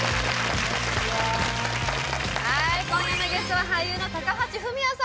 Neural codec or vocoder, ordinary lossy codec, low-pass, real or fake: none; none; none; real